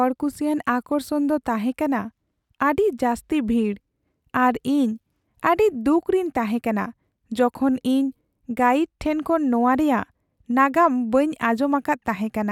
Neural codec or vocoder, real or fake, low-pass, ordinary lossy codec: none; real; 19.8 kHz; none